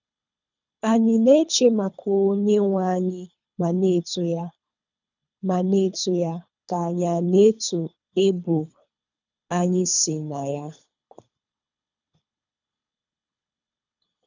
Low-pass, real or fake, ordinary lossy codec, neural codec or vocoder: 7.2 kHz; fake; none; codec, 24 kHz, 3 kbps, HILCodec